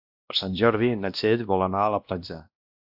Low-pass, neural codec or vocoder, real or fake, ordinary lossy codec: 5.4 kHz; codec, 16 kHz, 1 kbps, X-Codec, WavLM features, trained on Multilingual LibriSpeech; fake; AAC, 48 kbps